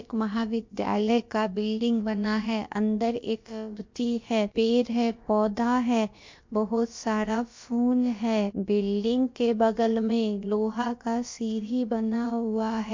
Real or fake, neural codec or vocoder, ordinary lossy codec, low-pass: fake; codec, 16 kHz, about 1 kbps, DyCAST, with the encoder's durations; MP3, 48 kbps; 7.2 kHz